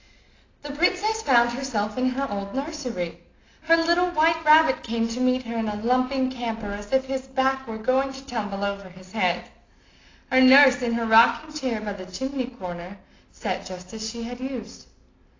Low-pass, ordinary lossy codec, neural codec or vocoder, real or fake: 7.2 kHz; AAC, 32 kbps; none; real